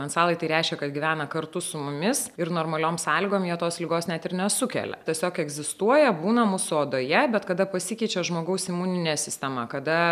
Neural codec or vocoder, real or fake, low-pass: none; real; 14.4 kHz